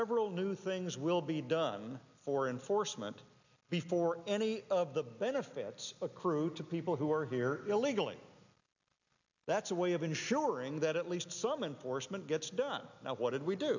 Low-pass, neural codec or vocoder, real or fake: 7.2 kHz; none; real